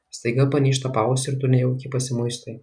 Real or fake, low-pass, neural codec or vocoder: real; 9.9 kHz; none